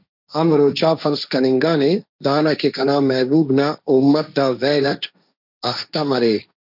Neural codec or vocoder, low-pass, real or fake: codec, 16 kHz, 1.1 kbps, Voila-Tokenizer; 5.4 kHz; fake